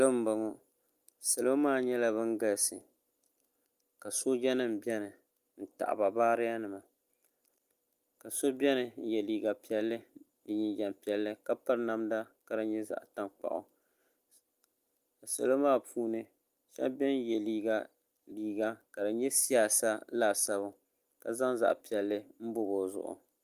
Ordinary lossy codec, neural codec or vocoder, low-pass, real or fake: Opus, 32 kbps; none; 14.4 kHz; real